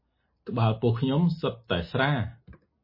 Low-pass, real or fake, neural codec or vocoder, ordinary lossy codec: 5.4 kHz; real; none; MP3, 24 kbps